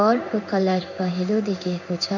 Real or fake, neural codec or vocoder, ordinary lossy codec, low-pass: fake; codec, 16 kHz in and 24 kHz out, 1 kbps, XY-Tokenizer; none; 7.2 kHz